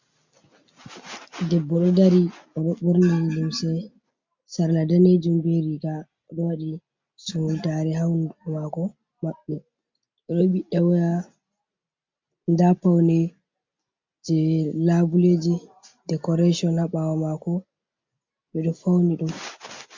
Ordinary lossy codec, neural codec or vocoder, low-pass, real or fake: MP3, 64 kbps; none; 7.2 kHz; real